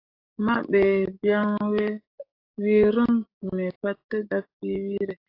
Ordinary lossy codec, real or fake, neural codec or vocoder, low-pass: AAC, 32 kbps; fake; codec, 44.1 kHz, 7.8 kbps, DAC; 5.4 kHz